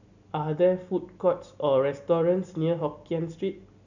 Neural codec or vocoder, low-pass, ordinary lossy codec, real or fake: none; 7.2 kHz; none; real